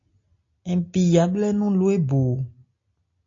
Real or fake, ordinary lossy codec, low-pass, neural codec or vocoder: real; AAC, 48 kbps; 7.2 kHz; none